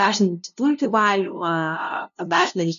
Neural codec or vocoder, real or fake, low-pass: codec, 16 kHz, 0.5 kbps, FunCodec, trained on LibriTTS, 25 frames a second; fake; 7.2 kHz